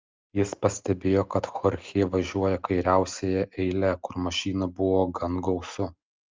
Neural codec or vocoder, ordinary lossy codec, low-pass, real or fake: none; Opus, 24 kbps; 7.2 kHz; real